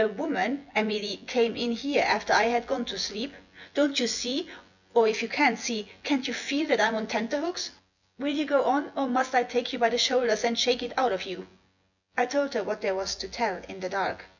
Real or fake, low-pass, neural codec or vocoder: fake; 7.2 kHz; vocoder, 24 kHz, 100 mel bands, Vocos